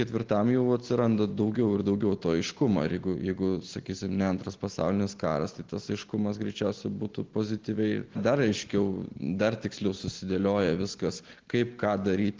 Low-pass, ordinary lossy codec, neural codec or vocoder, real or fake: 7.2 kHz; Opus, 16 kbps; none; real